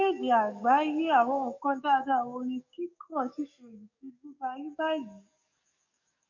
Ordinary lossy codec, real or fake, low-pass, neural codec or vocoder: none; fake; 7.2 kHz; codec, 44.1 kHz, 7.8 kbps, DAC